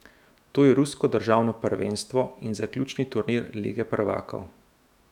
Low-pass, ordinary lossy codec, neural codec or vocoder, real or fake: 19.8 kHz; none; autoencoder, 48 kHz, 128 numbers a frame, DAC-VAE, trained on Japanese speech; fake